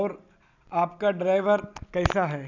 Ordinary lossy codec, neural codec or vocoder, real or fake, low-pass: none; none; real; 7.2 kHz